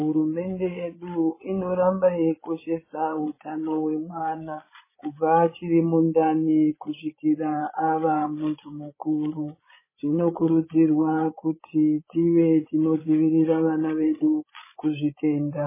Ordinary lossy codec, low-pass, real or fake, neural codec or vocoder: MP3, 16 kbps; 3.6 kHz; fake; codec, 16 kHz, 16 kbps, FreqCodec, larger model